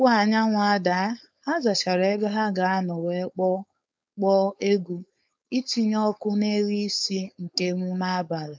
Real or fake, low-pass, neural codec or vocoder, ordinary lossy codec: fake; none; codec, 16 kHz, 4.8 kbps, FACodec; none